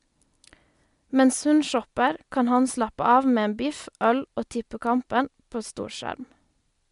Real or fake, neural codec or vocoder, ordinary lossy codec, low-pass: real; none; MP3, 64 kbps; 10.8 kHz